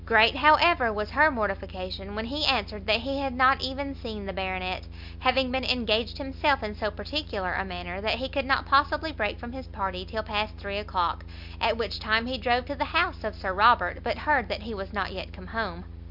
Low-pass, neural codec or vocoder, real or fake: 5.4 kHz; none; real